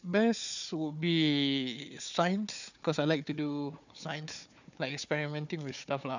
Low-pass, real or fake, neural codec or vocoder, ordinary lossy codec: 7.2 kHz; fake; codec, 16 kHz, 4 kbps, FunCodec, trained on Chinese and English, 50 frames a second; none